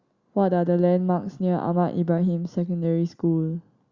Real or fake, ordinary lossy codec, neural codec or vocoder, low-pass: real; Opus, 64 kbps; none; 7.2 kHz